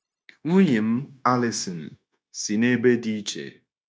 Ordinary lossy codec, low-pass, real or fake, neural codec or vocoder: none; none; fake; codec, 16 kHz, 0.9 kbps, LongCat-Audio-Codec